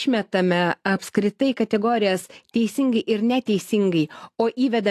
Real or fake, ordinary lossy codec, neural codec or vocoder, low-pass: real; AAC, 64 kbps; none; 14.4 kHz